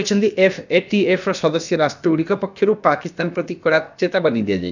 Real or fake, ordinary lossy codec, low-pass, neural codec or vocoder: fake; none; 7.2 kHz; codec, 16 kHz, about 1 kbps, DyCAST, with the encoder's durations